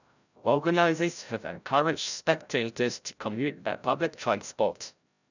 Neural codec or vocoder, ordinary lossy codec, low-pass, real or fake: codec, 16 kHz, 0.5 kbps, FreqCodec, larger model; none; 7.2 kHz; fake